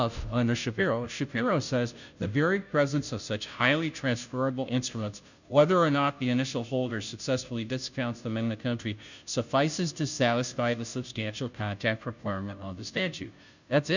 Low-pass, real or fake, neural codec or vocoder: 7.2 kHz; fake; codec, 16 kHz, 0.5 kbps, FunCodec, trained on Chinese and English, 25 frames a second